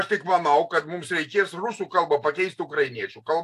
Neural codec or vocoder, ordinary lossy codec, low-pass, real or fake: none; AAC, 64 kbps; 14.4 kHz; real